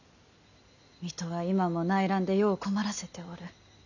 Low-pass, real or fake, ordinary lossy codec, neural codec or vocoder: 7.2 kHz; real; none; none